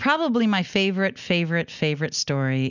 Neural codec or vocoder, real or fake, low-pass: none; real; 7.2 kHz